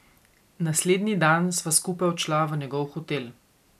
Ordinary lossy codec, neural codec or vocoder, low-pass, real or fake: none; none; 14.4 kHz; real